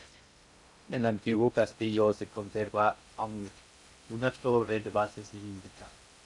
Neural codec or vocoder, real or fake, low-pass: codec, 16 kHz in and 24 kHz out, 0.6 kbps, FocalCodec, streaming, 2048 codes; fake; 10.8 kHz